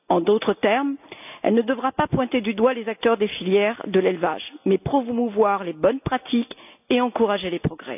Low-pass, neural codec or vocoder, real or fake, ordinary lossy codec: 3.6 kHz; none; real; none